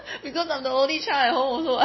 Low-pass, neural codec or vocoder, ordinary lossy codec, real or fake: 7.2 kHz; none; MP3, 24 kbps; real